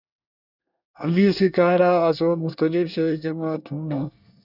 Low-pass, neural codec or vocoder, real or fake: 5.4 kHz; codec, 24 kHz, 1 kbps, SNAC; fake